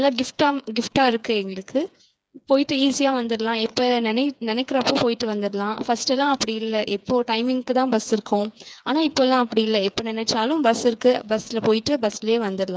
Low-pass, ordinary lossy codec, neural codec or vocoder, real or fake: none; none; codec, 16 kHz, 4 kbps, FreqCodec, smaller model; fake